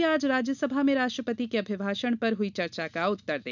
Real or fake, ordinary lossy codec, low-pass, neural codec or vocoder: real; none; 7.2 kHz; none